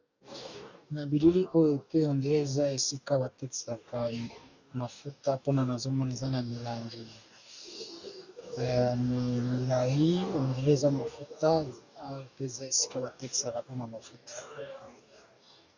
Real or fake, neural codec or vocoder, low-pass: fake; codec, 44.1 kHz, 2.6 kbps, DAC; 7.2 kHz